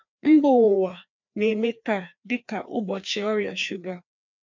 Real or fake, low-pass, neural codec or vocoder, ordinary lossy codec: fake; 7.2 kHz; codec, 16 kHz, 2 kbps, FreqCodec, larger model; MP3, 64 kbps